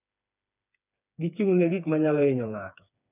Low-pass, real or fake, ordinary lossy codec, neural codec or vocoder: 3.6 kHz; fake; none; codec, 16 kHz, 4 kbps, FreqCodec, smaller model